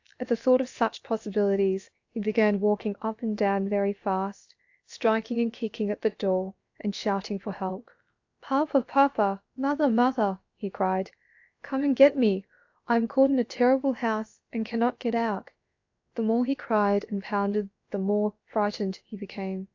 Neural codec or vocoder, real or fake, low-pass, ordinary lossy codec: codec, 16 kHz, about 1 kbps, DyCAST, with the encoder's durations; fake; 7.2 kHz; AAC, 48 kbps